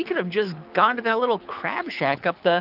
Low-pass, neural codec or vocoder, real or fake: 5.4 kHz; codec, 24 kHz, 6 kbps, HILCodec; fake